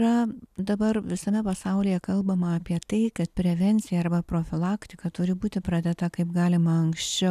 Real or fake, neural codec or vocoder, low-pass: real; none; 14.4 kHz